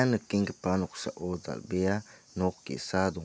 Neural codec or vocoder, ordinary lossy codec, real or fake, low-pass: none; none; real; none